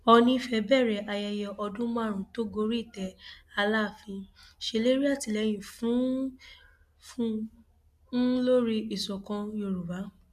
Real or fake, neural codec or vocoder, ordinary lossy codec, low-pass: real; none; none; 14.4 kHz